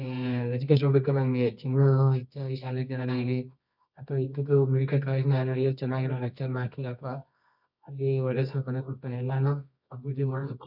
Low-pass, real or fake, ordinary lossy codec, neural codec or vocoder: 5.4 kHz; fake; none; codec, 24 kHz, 0.9 kbps, WavTokenizer, medium music audio release